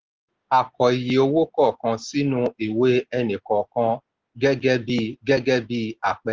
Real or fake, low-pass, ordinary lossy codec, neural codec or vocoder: real; 7.2 kHz; Opus, 16 kbps; none